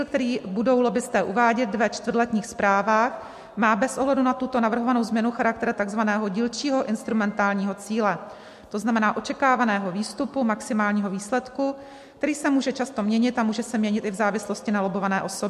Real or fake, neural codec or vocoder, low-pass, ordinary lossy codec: real; none; 14.4 kHz; MP3, 64 kbps